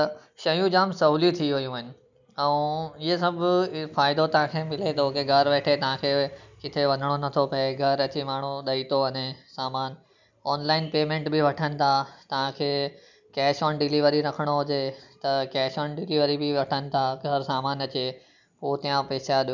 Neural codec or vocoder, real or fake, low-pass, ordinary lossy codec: none; real; 7.2 kHz; none